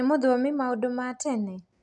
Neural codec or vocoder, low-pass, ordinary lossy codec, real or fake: none; 10.8 kHz; none; real